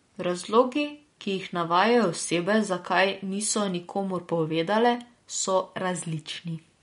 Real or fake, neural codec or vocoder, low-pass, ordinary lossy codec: real; none; 10.8 kHz; MP3, 48 kbps